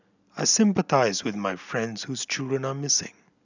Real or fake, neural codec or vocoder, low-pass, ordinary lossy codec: real; none; 7.2 kHz; none